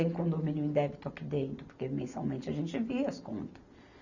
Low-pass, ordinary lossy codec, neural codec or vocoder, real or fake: 7.2 kHz; none; none; real